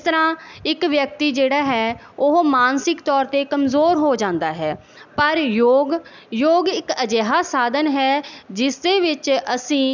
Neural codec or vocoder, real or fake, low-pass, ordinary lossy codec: none; real; 7.2 kHz; none